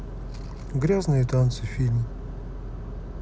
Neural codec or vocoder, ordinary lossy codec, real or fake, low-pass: none; none; real; none